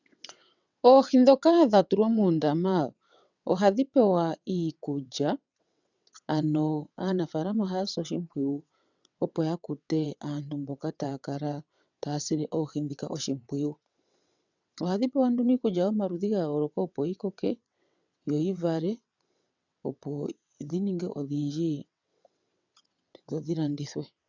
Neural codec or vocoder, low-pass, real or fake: vocoder, 22.05 kHz, 80 mel bands, WaveNeXt; 7.2 kHz; fake